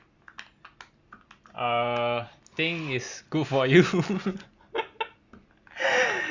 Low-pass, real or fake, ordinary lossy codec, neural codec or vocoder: 7.2 kHz; real; Opus, 64 kbps; none